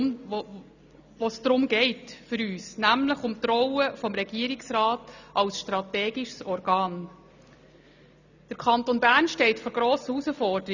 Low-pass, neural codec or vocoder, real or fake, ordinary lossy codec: 7.2 kHz; none; real; none